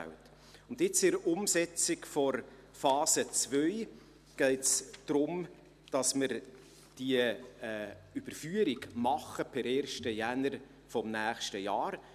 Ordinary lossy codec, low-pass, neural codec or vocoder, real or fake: none; 14.4 kHz; none; real